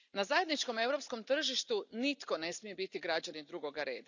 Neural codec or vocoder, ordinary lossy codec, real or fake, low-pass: none; none; real; 7.2 kHz